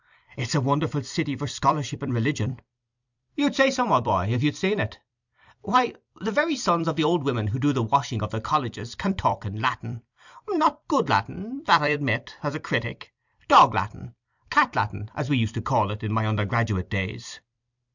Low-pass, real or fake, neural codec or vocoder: 7.2 kHz; fake; vocoder, 44.1 kHz, 128 mel bands every 256 samples, BigVGAN v2